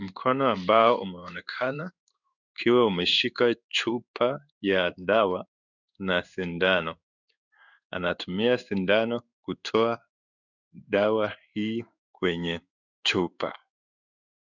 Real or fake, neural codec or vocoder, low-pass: fake; codec, 16 kHz in and 24 kHz out, 1 kbps, XY-Tokenizer; 7.2 kHz